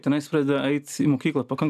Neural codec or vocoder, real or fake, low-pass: none; real; 14.4 kHz